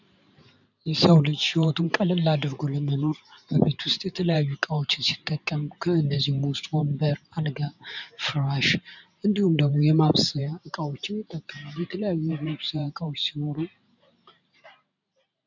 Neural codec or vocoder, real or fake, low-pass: vocoder, 44.1 kHz, 128 mel bands every 512 samples, BigVGAN v2; fake; 7.2 kHz